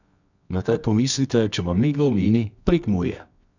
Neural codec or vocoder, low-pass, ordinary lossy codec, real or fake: codec, 24 kHz, 0.9 kbps, WavTokenizer, medium music audio release; 7.2 kHz; none; fake